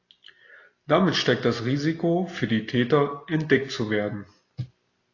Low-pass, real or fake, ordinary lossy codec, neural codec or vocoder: 7.2 kHz; real; AAC, 32 kbps; none